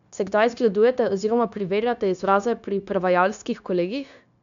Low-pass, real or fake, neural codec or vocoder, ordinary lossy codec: 7.2 kHz; fake; codec, 16 kHz, 0.9 kbps, LongCat-Audio-Codec; none